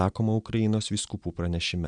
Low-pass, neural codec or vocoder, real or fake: 9.9 kHz; none; real